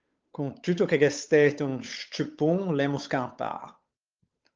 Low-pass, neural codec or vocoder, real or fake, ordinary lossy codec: 7.2 kHz; codec, 16 kHz, 8 kbps, FunCodec, trained on Chinese and English, 25 frames a second; fake; Opus, 24 kbps